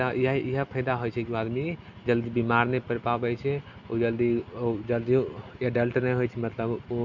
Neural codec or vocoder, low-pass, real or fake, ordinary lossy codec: none; 7.2 kHz; real; none